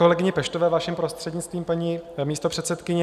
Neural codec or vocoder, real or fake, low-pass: none; real; 14.4 kHz